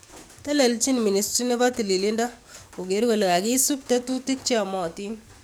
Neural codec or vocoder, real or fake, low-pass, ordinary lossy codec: codec, 44.1 kHz, 7.8 kbps, DAC; fake; none; none